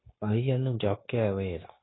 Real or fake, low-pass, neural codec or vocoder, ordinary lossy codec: fake; 7.2 kHz; codec, 16 kHz, 0.9 kbps, LongCat-Audio-Codec; AAC, 16 kbps